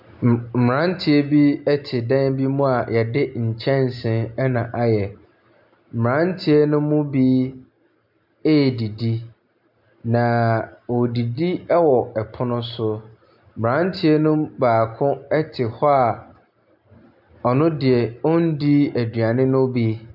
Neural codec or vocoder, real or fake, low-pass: none; real; 5.4 kHz